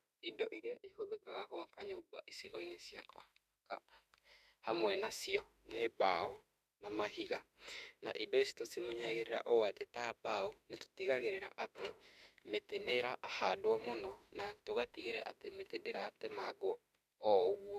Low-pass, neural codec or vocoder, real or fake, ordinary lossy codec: 14.4 kHz; autoencoder, 48 kHz, 32 numbers a frame, DAC-VAE, trained on Japanese speech; fake; none